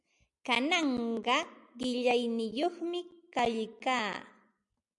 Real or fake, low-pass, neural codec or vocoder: real; 10.8 kHz; none